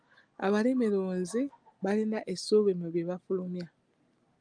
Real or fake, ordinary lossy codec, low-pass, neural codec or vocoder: real; Opus, 32 kbps; 9.9 kHz; none